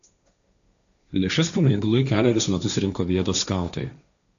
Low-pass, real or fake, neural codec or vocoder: 7.2 kHz; fake; codec, 16 kHz, 1.1 kbps, Voila-Tokenizer